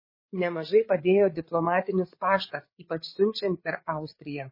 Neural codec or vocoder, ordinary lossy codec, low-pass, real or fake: codec, 16 kHz, 8 kbps, FreqCodec, larger model; MP3, 24 kbps; 5.4 kHz; fake